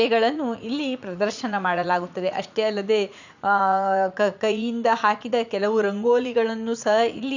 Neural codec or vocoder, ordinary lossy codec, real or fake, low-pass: vocoder, 44.1 kHz, 80 mel bands, Vocos; none; fake; 7.2 kHz